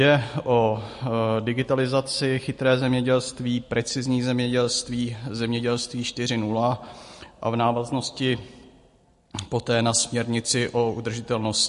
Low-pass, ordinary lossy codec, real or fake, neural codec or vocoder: 10.8 kHz; MP3, 48 kbps; real; none